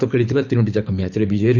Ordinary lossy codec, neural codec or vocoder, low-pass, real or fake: none; codec, 24 kHz, 6 kbps, HILCodec; 7.2 kHz; fake